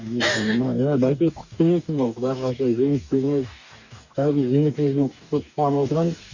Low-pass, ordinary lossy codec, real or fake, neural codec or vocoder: 7.2 kHz; none; fake; codec, 44.1 kHz, 2.6 kbps, DAC